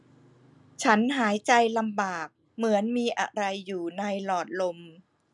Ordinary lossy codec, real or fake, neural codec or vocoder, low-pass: none; real; none; 10.8 kHz